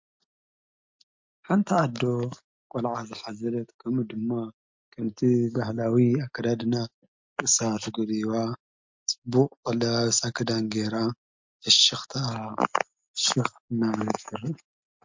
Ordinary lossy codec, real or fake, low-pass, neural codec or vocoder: MP3, 48 kbps; real; 7.2 kHz; none